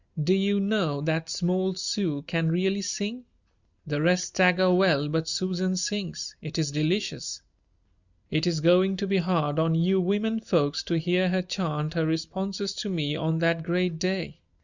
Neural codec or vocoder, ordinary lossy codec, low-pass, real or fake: none; Opus, 64 kbps; 7.2 kHz; real